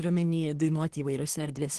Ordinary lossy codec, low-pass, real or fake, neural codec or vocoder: Opus, 16 kbps; 10.8 kHz; fake; codec, 24 kHz, 1 kbps, SNAC